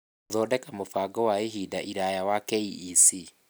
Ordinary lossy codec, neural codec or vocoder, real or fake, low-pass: none; none; real; none